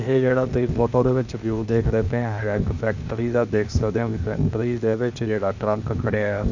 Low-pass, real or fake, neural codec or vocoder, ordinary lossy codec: 7.2 kHz; fake; codec, 16 kHz, 0.8 kbps, ZipCodec; none